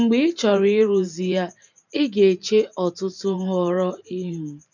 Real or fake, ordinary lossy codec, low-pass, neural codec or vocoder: fake; none; 7.2 kHz; vocoder, 44.1 kHz, 128 mel bands every 512 samples, BigVGAN v2